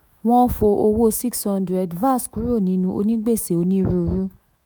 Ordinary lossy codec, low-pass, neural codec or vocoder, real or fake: none; none; autoencoder, 48 kHz, 128 numbers a frame, DAC-VAE, trained on Japanese speech; fake